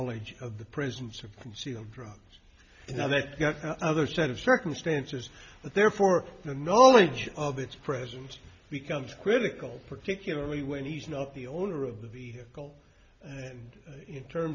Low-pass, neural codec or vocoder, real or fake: 7.2 kHz; none; real